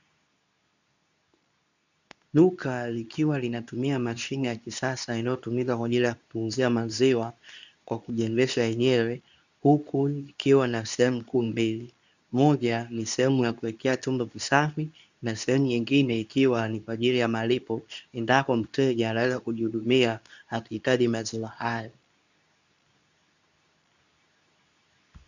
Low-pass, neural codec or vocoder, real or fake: 7.2 kHz; codec, 24 kHz, 0.9 kbps, WavTokenizer, medium speech release version 2; fake